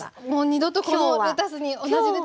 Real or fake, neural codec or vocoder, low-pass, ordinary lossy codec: real; none; none; none